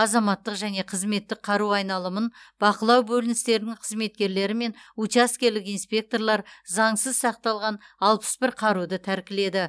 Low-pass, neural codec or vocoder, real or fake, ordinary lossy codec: none; none; real; none